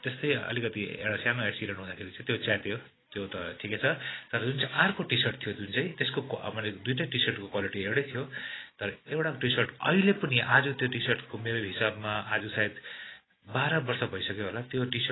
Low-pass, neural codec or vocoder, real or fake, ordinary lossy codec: 7.2 kHz; none; real; AAC, 16 kbps